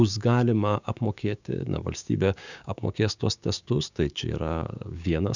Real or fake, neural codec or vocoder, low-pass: fake; codec, 16 kHz, 6 kbps, DAC; 7.2 kHz